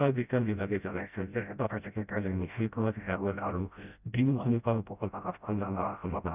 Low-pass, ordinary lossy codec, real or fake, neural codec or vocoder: 3.6 kHz; none; fake; codec, 16 kHz, 0.5 kbps, FreqCodec, smaller model